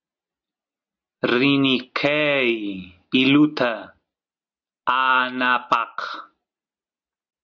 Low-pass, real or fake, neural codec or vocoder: 7.2 kHz; real; none